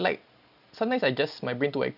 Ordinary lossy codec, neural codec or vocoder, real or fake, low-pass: none; none; real; 5.4 kHz